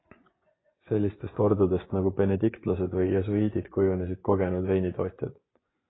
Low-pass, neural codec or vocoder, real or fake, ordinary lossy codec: 7.2 kHz; vocoder, 24 kHz, 100 mel bands, Vocos; fake; AAC, 16 kbps